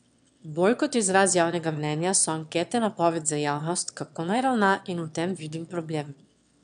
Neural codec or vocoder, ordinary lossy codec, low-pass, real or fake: autoencoder, 22.05 kHz, a latent of 192 numbers a frame, VITS, trained on one speaker; none; 9.9 kHz; fake